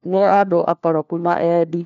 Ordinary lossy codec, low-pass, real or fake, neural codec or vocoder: none; 7.2 kHz; fake; codec, 16 kHz, 1 kbps, FunCodec, trained on LibriTTS, 50 frames a second